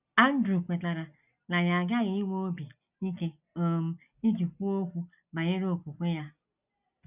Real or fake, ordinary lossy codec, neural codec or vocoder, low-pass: real; none; none; 3.6 kHz